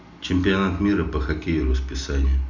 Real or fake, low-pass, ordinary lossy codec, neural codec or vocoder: real; 7.2 kHz; none; none